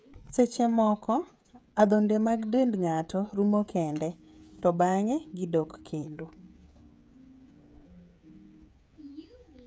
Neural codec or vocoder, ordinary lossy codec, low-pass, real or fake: codec, 16 kHz, 16 kbps, FreqCodec, smaller model; none; none; fake